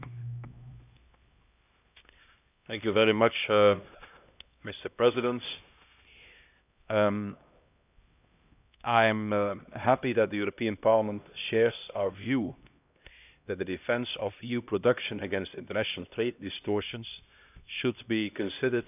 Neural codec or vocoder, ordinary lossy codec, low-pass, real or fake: codec, 16 kHz, 1 kbps, X-Codec, HuBERT features, trained on LibriSpeech; none; 3.6 kHz; fake